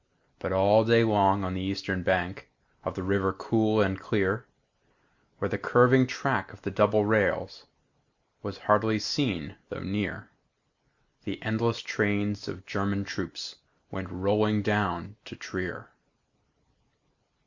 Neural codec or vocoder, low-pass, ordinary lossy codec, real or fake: none; 7.2 kHz; Opus, 64 kbps; real